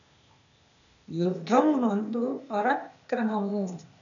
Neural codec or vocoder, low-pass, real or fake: codec, 16 kHz, 0.8 kbps, ZipCodec; 7.2 kHz; fake